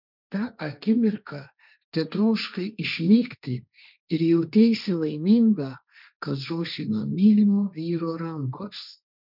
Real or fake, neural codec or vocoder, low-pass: fake; codec, 16 kHz, 1.1 kbps, Voila-Tokenizer; 5.4 kHz